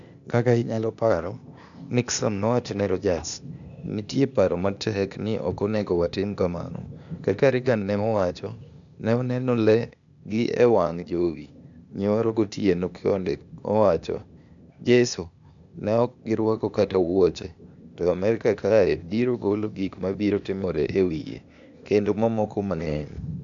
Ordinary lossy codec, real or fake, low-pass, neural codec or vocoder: none; fake; 7.2 kHz; codec, 16 kHz, 0.8 kbps, ZipCodec